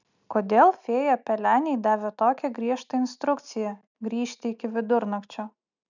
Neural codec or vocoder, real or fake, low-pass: none; real; 7.2 kHz